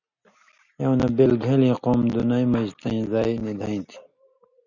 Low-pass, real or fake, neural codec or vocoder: 7.2 kHz; real; none